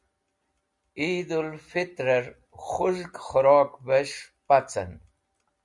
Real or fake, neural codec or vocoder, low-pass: real; none; 10.8 kHz